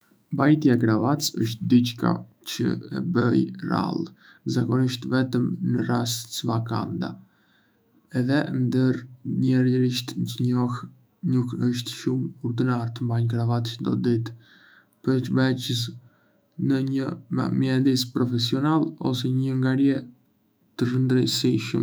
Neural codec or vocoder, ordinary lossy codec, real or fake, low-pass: autoencoder, 48 kHz, 128 numbers a frame, DAC-VAE, trained on Japanese speech; none; fake; none